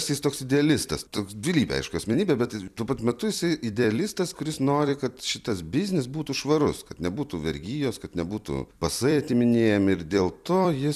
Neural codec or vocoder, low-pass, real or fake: vocoder, 44.1 kHz, 128 mel bands every 256 samples, BigVGAN v2; 14.4 kHz; fake